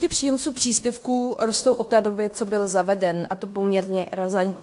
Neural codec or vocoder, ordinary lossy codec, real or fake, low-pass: codec, 16 kHz in and 24 kHz out, 0.9 kbps, LongCat-Audio-Codec, fine tuned four codebook decoder; AAC, 64 kbps; fake; 10.8 kHz